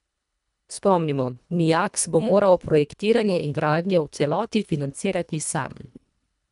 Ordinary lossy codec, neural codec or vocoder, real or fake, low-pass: none; codec, 24 kHz, 1.5 kbps, HILCodec; fake; 10.8 kHz